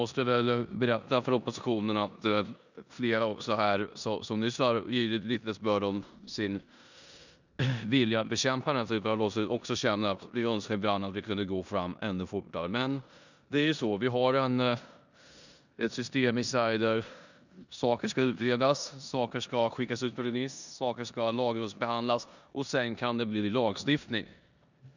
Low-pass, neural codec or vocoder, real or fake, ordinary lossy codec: 7.2 kHz; codec, 16 kHz in and 24 kHz out, 0.9 kbps, LongCat-Audio-Codec, four codebook decoder; fake; none